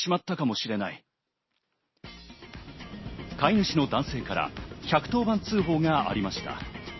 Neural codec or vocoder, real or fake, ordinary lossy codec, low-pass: none; real; MP3, 24 kbps; 7.2 kHz